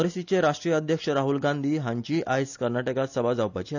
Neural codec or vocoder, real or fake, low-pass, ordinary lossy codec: none; real; 7.2 kHz; none